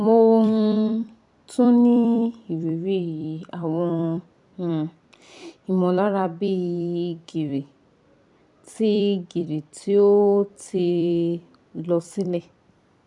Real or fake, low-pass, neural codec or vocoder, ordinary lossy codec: fake; 10.8 kHz; vocoder, 44.1 kHz, 128 mel bands every 256 samples, BigVGAN v2; none